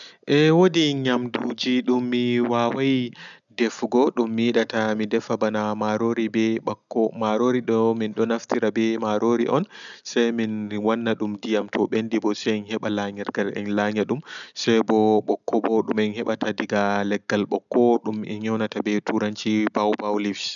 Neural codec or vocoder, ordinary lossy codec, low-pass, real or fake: none; none; 7.2 kHz; real